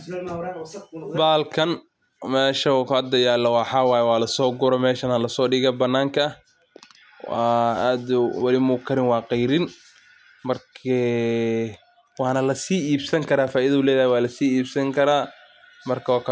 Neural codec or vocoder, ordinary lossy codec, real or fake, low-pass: none; none; real; none